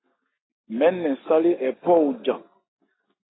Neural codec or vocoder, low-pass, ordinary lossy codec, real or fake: none; 7.2 kHz; AAC, 16 kbps; real